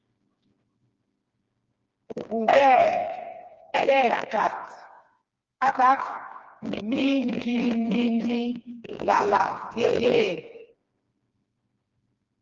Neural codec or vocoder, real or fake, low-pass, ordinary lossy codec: codec, 16 kHz, 2 kbps, FreqCodec, smaller model; fake; 7.2 kHz; Opus, 32 kbps